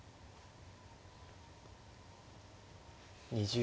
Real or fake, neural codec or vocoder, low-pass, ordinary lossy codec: real; none; none; none